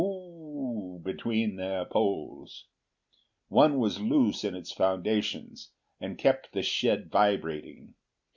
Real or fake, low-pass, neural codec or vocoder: real; 7.2 kHz; none